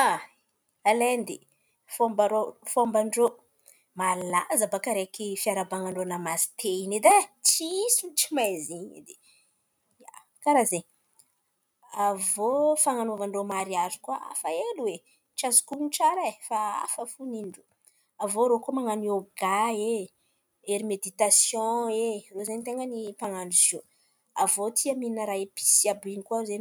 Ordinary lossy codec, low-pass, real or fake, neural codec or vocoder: none; none; real; none